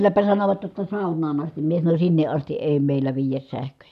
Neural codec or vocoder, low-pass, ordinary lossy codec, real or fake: none; 14.4 kHz; none; real